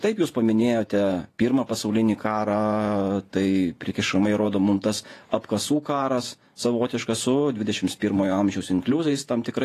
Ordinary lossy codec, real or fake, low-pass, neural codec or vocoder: AAC, 48 kbps; fake; 14.4 kHz; vocoder, 48 kHz, 128 mel bands, Vocos